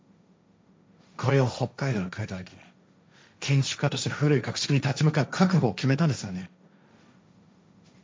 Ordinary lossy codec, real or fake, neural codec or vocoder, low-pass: none; fake; codec, 16 kHz, 1.1 kbps, Voila-Tokenizer; none